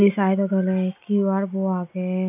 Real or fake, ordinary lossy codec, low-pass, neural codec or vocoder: real; none; 3.6 kHz; none